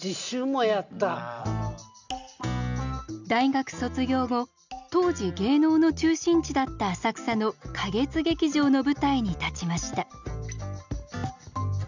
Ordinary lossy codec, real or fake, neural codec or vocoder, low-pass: none; real; none; 7.2 kHz